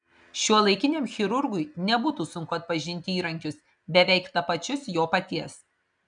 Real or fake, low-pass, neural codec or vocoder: real; 9.9 kHz; none